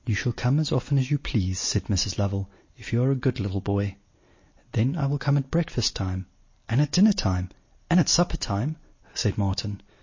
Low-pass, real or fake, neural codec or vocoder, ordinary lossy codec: 7.2 kHz; real; none; MP3, 32 kbps